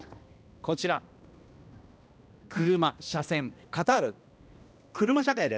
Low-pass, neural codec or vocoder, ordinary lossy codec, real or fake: none; codec, 16 kHz, 1 kbps, X-Codec, HuBERT features, trained on balanced general audio; none; fake